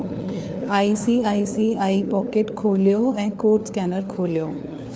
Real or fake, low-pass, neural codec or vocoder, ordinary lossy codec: fake; none; codec, 16 kHz, 4 kbps, FunCodec, trained on LibriTTS, 50 frames a second; none